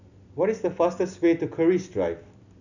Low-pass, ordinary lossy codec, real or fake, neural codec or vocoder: 7.2 kHz; none; real; none